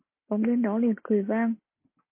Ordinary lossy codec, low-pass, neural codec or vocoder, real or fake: MP3, 24 kbps; 3.6 kHz; none; real